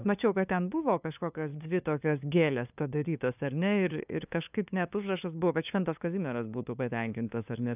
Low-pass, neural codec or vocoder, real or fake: 3.6 kHz; codec, 16 kHz, 2 kbps, FunCodec, trained on LibriTTS, 25 frames a second; fake